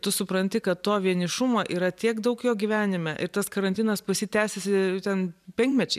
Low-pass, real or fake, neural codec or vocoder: 14.4 kHz; fake; vocoder, 44.1 kHz, 128 mel bands every 512 samples, BigVGAN v2